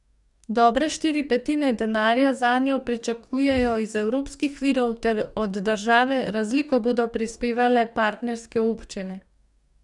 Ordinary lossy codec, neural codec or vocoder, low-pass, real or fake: none; codec, 44.1 kHz, 2.6 kbps, DAC; 10.8 kHz; fake